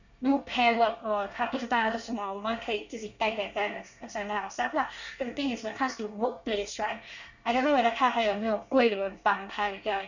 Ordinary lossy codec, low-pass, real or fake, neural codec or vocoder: none; 7.2 kHz; fake; codec, 24 kHz, 1 kbps, SNAC